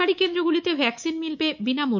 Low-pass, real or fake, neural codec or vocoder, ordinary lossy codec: 7.2 kHz; fake; codec, 24 kHz, 3.1 kbps, DualCodec; none